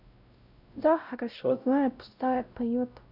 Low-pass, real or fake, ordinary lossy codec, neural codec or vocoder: 5.4 kHz; fake; none; codec, 16 kHz, 0.5 kbps, X-Codec, WavLM features, trained on Multilingual LibriSpeech